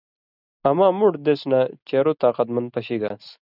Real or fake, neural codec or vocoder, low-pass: real; none; 5.4 kHz